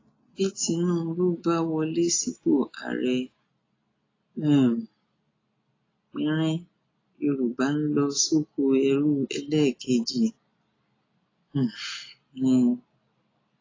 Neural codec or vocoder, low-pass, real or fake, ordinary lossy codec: none; 7.2 kHz; real; AAC, 32 kbps